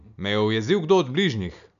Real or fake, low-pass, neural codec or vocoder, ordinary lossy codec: real; 7.2 kHz; none; none